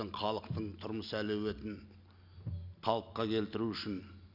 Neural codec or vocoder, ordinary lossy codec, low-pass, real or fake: none; none; 5.4 kHz; real